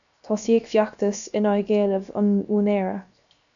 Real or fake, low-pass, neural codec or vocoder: fake; 7.2 kHz; codec, 16 kHz, 0.7 kbps, FocalCodec